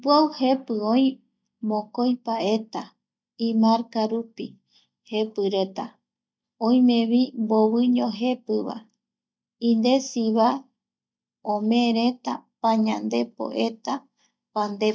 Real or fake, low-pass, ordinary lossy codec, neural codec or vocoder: real; none; none; none